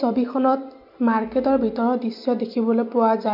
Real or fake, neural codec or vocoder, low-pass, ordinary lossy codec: real; none; 5.4 kHz; MP3, 48 kbps